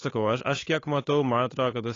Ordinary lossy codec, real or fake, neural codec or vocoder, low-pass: AAC, 32 kbps; fake; codec, 16 kHz, 8 kbps, FunCodec, trained on Chinese and English, 25 frames a second; 7.2 kHz